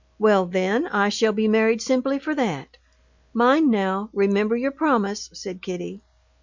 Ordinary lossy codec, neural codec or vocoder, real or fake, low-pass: Opus, 64 kbps; none; real; 7.2 kHz